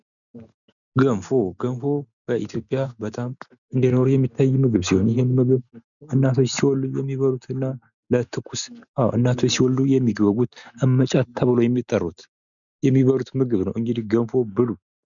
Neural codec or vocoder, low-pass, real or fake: none; 7.2 kHz; real